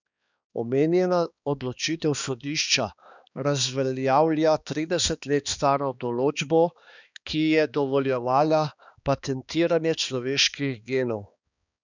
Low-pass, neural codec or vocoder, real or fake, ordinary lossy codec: 7.2 kHz; codec, 16 kHz, 2 kbps, X-Codec, HuBERT features, trained on balanced general audio; fake; none